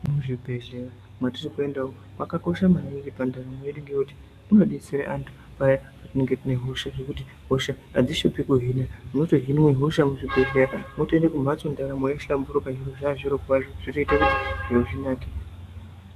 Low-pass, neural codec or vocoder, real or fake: 14.4 kHz; codec, 44.1 kHz, 7.8 kbps, DAC; fake